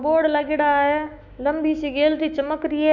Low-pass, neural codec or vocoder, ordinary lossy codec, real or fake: 7.2 kHz; autoencoder, 48 kHz, 128 numbers a frame, DAC-VAE, trained on Japanese speech; none; fake